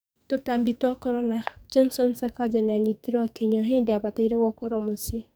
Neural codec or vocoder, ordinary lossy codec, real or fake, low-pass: codec, 44.1 kHz, 2.6 kbps, SNAC; none; fake; none